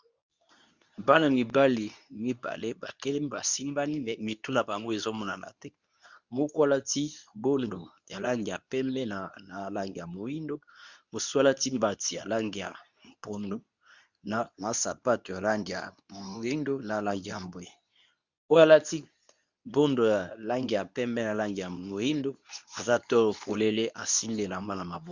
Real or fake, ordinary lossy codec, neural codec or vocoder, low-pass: fake; Opus, 64 kbps; codec, 24 kHz, 0.9 kbps, WavTokenizer, medium speech release version 2; 7.2 kHz